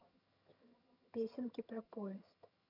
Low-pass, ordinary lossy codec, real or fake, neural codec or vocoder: 5.4 kHz; none; fake; vocoder, 22.05 kHz, 80 mel bands, HiFi-GAN